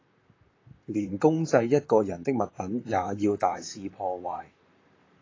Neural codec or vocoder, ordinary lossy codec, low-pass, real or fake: vocoder, 44.1 kHz, 128 mel bands, Pupu-Vocoder; AAC, 32 kbps; 7.2 kHz; fake